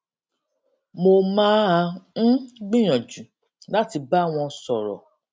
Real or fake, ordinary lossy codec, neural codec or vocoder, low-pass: real; none; none; none